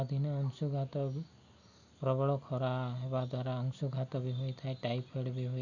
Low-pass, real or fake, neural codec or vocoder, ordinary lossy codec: 7.2 kHz; real; none; none